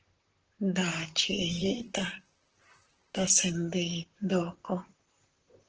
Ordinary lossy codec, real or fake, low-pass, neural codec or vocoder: Opus, 16 kbps; fake; 7.2 kHz; vocoder, 44.1 kHz, 128 mel bands, Pupu-Vocoder